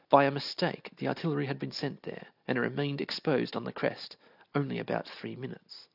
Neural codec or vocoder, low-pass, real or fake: none; 5.4 kHz; real